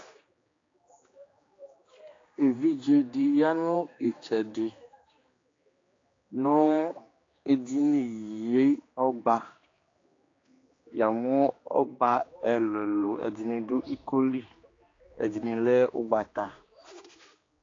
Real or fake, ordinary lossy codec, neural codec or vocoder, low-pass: fake; AAC, 32 kbps; codec, 16 kHz, 2 kbps, X-Codec, HuBERT features, trained on general audio; 7.2 kHz